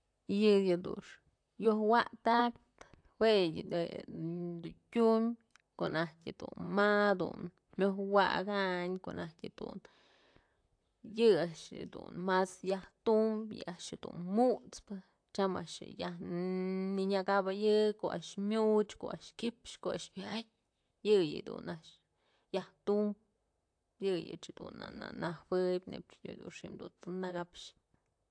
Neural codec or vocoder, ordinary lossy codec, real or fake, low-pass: vocoder, 44.1 kHz, 128 mel bands, Pupu-Vocoder; AAC, 64 kbps; fake; 9.9 kHz